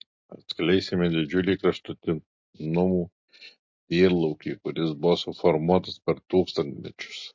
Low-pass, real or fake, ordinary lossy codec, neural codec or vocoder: 7.2 kHz; real; MP3, 48 kbps; none